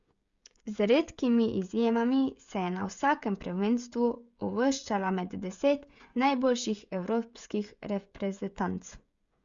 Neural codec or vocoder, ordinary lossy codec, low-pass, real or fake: codec, 16 kHz, 16 kbps, FreqCodec, smaller model; Opus, 64 kbps; 7.2 kHz; fake